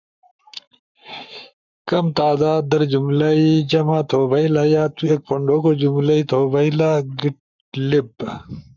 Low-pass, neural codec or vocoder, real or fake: 7.2 kHz; codec, 44.1 kHz, 7.8 kbps, Pupu-Codec; fake